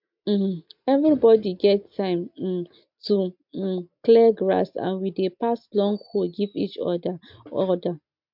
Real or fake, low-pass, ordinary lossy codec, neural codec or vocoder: real; 5.4 kHz; MP3, 48 kbps; none